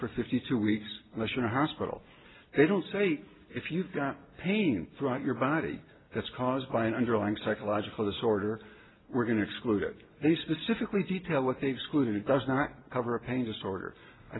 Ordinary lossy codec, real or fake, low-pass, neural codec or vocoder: AAC, 16 kbps; real; 7.2 kHz; none